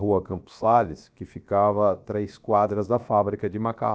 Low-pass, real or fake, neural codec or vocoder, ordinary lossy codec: none; fake; codec, 16 kHz, about 1 kbps, DyCAST, with the encoder's durations; none